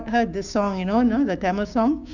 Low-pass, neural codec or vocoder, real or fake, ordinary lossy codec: 7.2 kHz; codec, 16 kHz, 6 kbps, DAC; fake; none